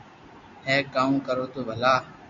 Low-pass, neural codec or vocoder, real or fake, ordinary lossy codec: 7.2 kHz; none; real; MP3, 96 kbps